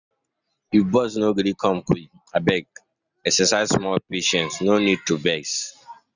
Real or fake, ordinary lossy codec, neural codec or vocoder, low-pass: real; none; none; 7.2 kHz